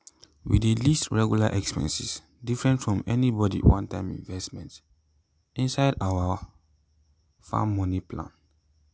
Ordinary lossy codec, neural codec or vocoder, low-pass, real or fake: none; none; none; real